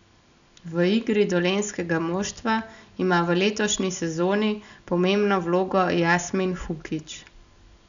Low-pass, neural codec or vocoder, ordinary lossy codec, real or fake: 7.2 kHz; none; none; real